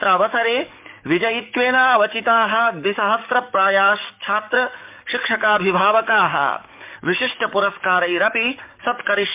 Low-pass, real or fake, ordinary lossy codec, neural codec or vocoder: 3.6 kHz; fake; MP3, 32 kbps; codec, 44.1 kHz, 7.8 kbps, DAC